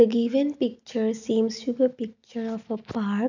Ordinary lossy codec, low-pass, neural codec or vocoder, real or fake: none; 7.2 kHz; none; real